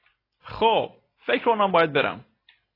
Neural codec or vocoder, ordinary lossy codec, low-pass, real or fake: none; AAC, 24 kbps; 5.4 kHz; real